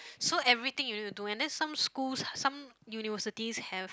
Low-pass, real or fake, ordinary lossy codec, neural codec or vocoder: none; real; none; none